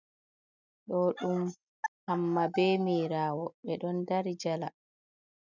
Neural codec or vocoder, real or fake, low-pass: none; real; 7.2 kHz